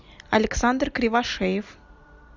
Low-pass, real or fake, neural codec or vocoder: 7.2 kHz; real; none